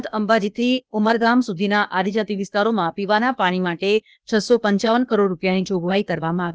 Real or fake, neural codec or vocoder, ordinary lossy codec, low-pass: fake; codec, 16 kHz, 0.8 kbps, ZipCodec; none; none